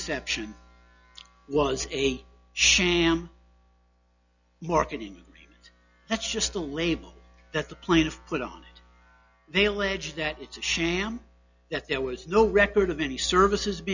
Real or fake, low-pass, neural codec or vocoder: real; 7.2 kHz; none